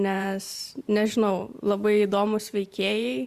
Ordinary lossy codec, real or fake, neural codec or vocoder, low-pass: Opus, 64 kbps; fake; vocoder, 44.1 kHz, 128 mel bands every 512 samples, BigVGAN v2; 14.4 kHz